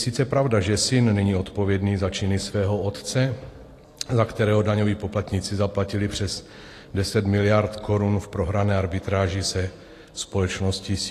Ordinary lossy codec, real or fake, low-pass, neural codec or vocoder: AAC, 48 kbps; real; 14.4 kHz; none